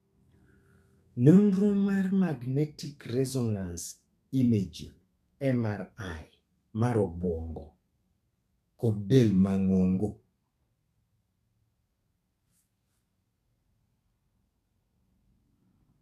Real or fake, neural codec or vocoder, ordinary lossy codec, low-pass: fake; codec, 32 kHz, 1.9 kbps, SNAC; none; 14.4 kHz